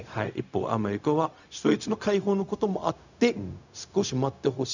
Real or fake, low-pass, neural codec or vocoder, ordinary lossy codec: fake; 7.2 kHz; codec, 16 kHz, 0.4 kbps, LongCat-Audio-Codec; none